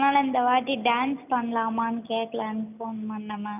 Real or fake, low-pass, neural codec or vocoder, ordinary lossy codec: real; 3.6 kHz; none; none